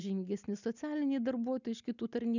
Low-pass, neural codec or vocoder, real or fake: 7.2 kHz; none; real